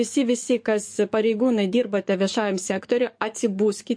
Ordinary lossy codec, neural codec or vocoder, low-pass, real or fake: MP3, 48 kbps; codec, 44.1 kHz, 7.8 kbps, DAC; 9.9 kHz; fake